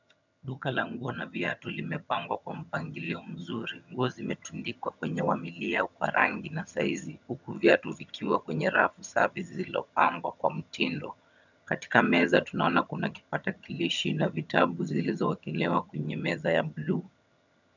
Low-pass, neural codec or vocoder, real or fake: 7.2 kHz; vocoder, 22.05 kHz, 80 mel bands, HiFi-GAN; fake